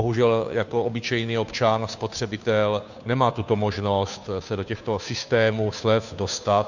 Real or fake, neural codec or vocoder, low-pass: fake; codec, 16 kHz, 2 kbps, FunCodec, trained on Chinese and English, 25 frames a second; 7.2 kHz